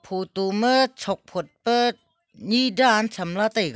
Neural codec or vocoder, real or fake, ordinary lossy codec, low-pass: none; real; none; none